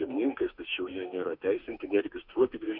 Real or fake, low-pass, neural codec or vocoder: fake; 5.4 kHz; autoencoder, 48 kHz, 32 numbers a frame, DAC-VAE, trained on Japanese speech